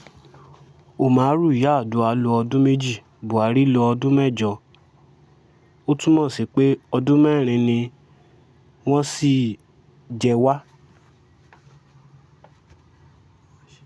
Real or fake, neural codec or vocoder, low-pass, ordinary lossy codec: real; none; none; none